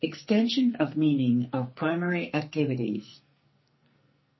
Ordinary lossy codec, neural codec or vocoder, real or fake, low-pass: MP3, 24 kbps; codec, 44.1 kHz, 2.6 kbps, SNAC; fake; 7.2 kHz